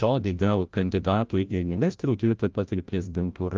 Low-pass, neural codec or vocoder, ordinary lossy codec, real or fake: 7.2 kHz; codec, 16 kHz, 0.5 kbps, FreqCodec, larger model; Opus, 32 kbps; fake